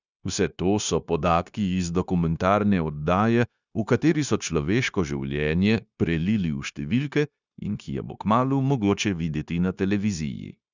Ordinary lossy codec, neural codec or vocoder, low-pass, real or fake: none; codec, 16 kHz, 0.9 kbps, LongCat-Audio-Codec; 7.2 kHz; fake